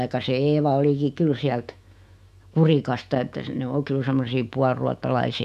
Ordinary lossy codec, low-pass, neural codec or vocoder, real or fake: none; 14.4 kHz; autoencoder, 48 kHz, 128 numbers a frame, DAC-VAE, trained on Japanese speech; fake